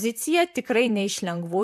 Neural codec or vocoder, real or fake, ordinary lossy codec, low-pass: vocoder, 44.1 kHz, 128 mel bands, Pupu-Vocoder; fake; MP3, 96 kbps; 14.4 kHz